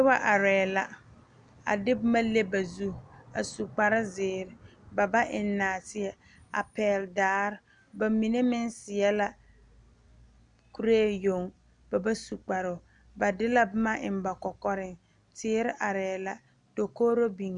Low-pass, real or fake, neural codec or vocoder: 9.9 kHz; real; none